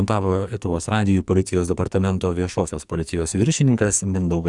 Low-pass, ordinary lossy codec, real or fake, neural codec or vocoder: 10.8 kHz; Opus, 64 kbps; fake; codec, 44.1 kHz, 2.6 kbps, SNAC